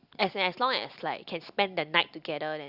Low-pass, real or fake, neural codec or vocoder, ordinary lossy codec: 5.4 kHz; real; none; Opus, 64 kbps